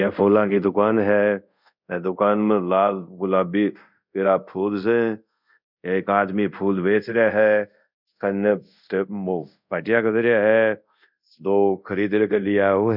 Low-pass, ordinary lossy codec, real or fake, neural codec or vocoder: 5.4 kHz; none; fake; codec, 24 kHz, 0.5 kbps, DualCodec